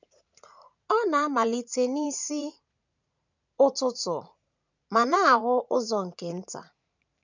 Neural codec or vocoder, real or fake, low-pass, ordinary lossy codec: vocoder, 44.1 kHz, 80 mel bands, Vocos; fake; 7.2 kHz; none